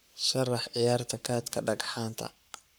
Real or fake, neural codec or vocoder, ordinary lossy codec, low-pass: fake; codec, 44.1 kHz, 7.8 kbps, Pupu-Codec; none; none